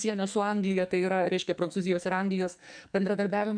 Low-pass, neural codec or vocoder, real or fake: 9.9 kHz; codec, 44.1 kHz, 2.6 kbps, SNAC; fake